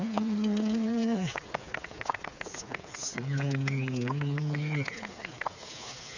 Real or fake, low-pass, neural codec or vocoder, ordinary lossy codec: fake; 7.2 kHz; codec, 16 kHz, 4 kbps, FreqCodec, smaller model; none